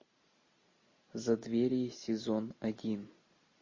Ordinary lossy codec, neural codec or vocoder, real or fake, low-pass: MP3, 32 kbps; none; real; 7.2 kHz